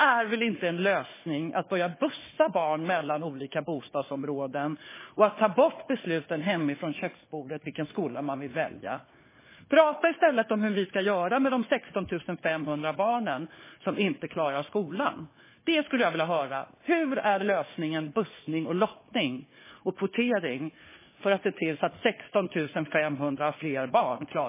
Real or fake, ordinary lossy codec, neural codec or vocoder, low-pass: fake; MP3, 16 kbps; codec, 16 kHz, 4 kbps, FunCodec, trained on Chinese and English, 50 frames a second; 3.6 kHz